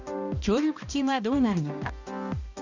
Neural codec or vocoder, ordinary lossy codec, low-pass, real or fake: codec, 16 kHz, 1 kbps, X-Codec, HuBERT features, trained on balanced general audio; none; 7.2 kHz; fake